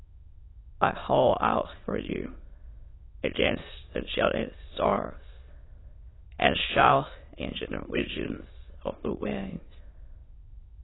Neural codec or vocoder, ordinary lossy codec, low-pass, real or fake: autoencoder, 22.05 kHz, a latent of 192 numbers a frame, VITS, trained on many speakers; AAC, 16 kbps; 7.2 kHz; fake